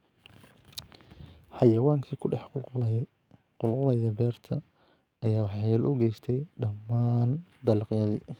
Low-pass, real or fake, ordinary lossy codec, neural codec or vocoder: 19.8 kHz; fake; none; codec, 44.1 kHz, 7.8 kbps, DAC